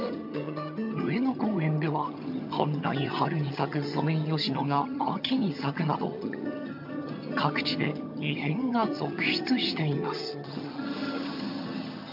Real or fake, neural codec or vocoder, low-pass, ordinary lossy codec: fake; vocoder, 22.05 kHz, 80 mel bands, HiFi-GAN; 5.4 kHz; MP3, 48 kbps